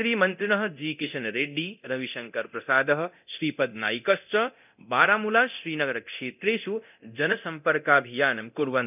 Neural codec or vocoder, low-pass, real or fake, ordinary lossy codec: codec, 24 kHz, 0.9 kbps, DualCodec; 3.6 kHz; fake; none